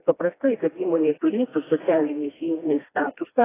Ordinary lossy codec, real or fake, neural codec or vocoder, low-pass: AAC, 16 kbps; fake; codec, 16 kHz, 1 kbps, FreqCodec, smaller model; 3.6 kHz